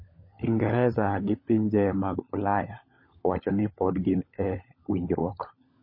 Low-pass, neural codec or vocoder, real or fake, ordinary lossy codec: 5.4 kHz; codec, 16 kHz, 16 kbps, FunCodec, trained on LibriTTS, 50 frames a second; fake; MP3, 24 kbps